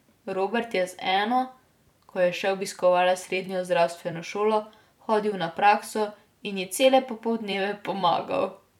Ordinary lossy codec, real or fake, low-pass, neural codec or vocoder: none; fake; 19.8 kHz; vocoder, 44.1 kHz, 128 mel bands every 256 samples, BigVGAN v2